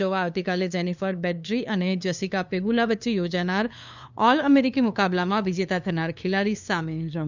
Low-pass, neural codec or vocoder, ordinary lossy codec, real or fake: 7.2 kHz; codec, 16 kHz, 2 kbps, FunCodec, trained on LibriTTS, 25 frames a second; none; fake